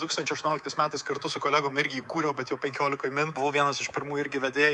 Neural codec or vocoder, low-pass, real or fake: codec, 24 kHz, 3.1 kbps, DualCodec; 10.8 kHz; fake